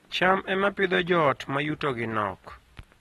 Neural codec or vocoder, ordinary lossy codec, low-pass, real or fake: none; AAC, 32 kbps; 19.8 kHz; real